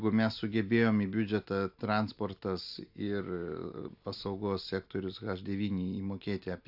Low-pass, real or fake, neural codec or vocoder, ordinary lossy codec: 5.4 kHz; real; none; AAC, 48 kbps